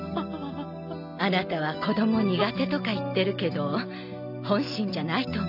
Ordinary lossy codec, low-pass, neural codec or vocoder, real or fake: none; 5.4 kHz; none; real